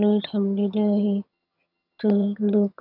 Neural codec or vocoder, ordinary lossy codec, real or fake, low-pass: vocoder, 22.05 kHz, 80 mel bands, HiFi-GAN; none; fake; 5.4 kHz